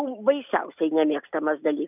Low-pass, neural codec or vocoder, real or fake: 3.6 kHz; none; real